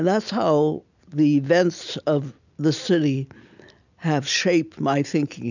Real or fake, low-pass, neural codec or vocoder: real; 7.2 kHz; none